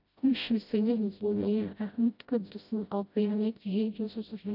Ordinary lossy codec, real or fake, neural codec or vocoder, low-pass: none; fake; codec, 16 kHz, 0.5 kbps, FreqCodec, smaller model; 5.4 kHz